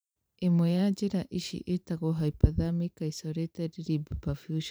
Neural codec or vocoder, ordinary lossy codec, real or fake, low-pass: vocoder, 44.1 kHz, 128 mel bands every 512 samples, BigVGAN v2; none; fake; none